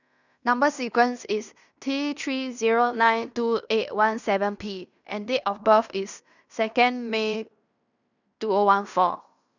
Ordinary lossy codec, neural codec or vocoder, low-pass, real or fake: none; codec, 16 kHz in and 24 kHz out, 0.9 kbps, LongCat-Audio-Codec, fine tuned four codebook decoder; 7.2 kHz; fake